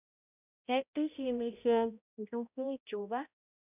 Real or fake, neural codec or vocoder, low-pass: fake; codec, 16 kHz, 0.5 kbps, X-Codec, HuBERT features, trained on balanced general audio; 3.6 kHz